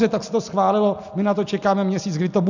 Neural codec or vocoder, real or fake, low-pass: none; real; 7.2 kHz